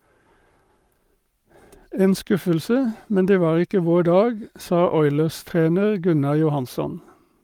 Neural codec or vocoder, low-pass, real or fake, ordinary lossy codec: codec, 44.1 kHz, 7.8 kbps, Pupu-Codec; 19.8 kHz; fake; Opus, 32 kbps